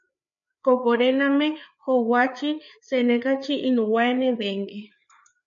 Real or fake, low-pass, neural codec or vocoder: fake; 7.2 kHz; codec, 16 kHz, 4 kbps, FreqCodec, larger model